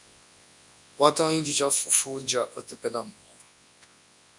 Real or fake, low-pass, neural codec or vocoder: fake; 10.8 kHz; codec, 24 kHz, 0.9 kbps, WavTokenizer, large speech release